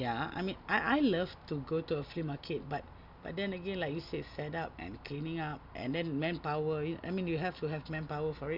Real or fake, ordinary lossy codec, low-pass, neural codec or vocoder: real; none; 5.4 kHz; none